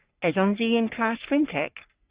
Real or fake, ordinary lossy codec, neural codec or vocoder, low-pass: fake; Opus, 64 kbps; codec, 44.1 kHz, 3.4 kbps, Pupu-Codec; 3.6 kHz